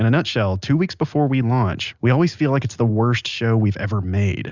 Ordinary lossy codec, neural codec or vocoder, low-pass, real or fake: Opus, 64 kbps; none; 7.2 kHz; real